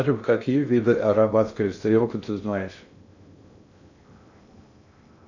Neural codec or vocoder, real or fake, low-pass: codec, 16 kHz in and 24 kHz out, 0.6 kbps, FocalCodec, streaming, 2048 codes; fake; 7.2 kHz